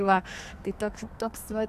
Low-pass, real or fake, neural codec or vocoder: 14.4 kHz; fake; codec, 44.1 kHz, 2.6 kbps, SNAC